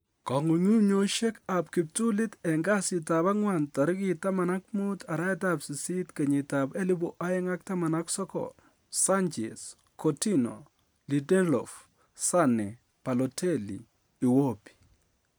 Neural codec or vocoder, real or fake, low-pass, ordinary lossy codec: none; real; none; none